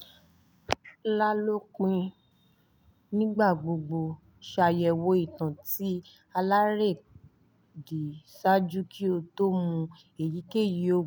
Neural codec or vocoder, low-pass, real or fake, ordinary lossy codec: none; none; real; none